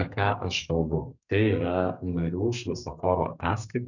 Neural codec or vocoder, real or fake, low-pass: codec, 32 kHz, 1.9 kbps, SNAC; fake; 7.2 kHz